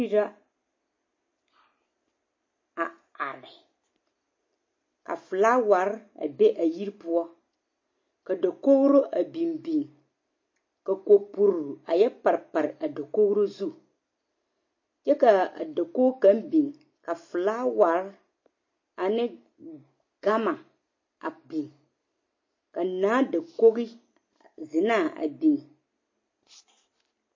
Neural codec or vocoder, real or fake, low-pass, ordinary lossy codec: none; real; 7.2 kHz; MP3, 32 kbps